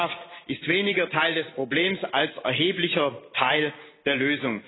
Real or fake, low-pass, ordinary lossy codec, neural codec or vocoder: real; 7.2 kHz; AAC, 16 kbps; none